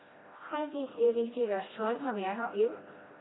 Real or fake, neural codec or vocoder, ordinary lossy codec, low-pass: fake; codec, 16 kHz, 1 kbps, FreqCodec, smaller model; AAC, 16 kbps; 7.2 kHz